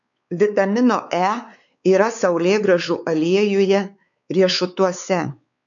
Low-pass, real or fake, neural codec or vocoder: 7.2 kHz; fake; codec, 16 kHz, 4 kbps, X-Codec, WavLM features, trained on Multilingual LibriSpeech